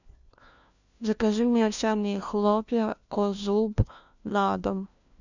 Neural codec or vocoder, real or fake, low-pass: codec, 16 kHz, 1 kbps, FunCodec, trained on LibriTTS, 50 frames a second; fake; 7.2 kHz